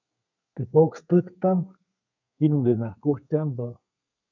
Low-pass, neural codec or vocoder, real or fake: 7.2 kHz; codec, 32 kHz, 1.9 kbps, SNAC; fake